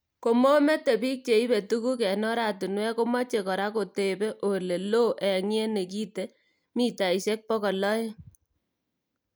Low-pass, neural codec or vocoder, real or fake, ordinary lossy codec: none; none; real; none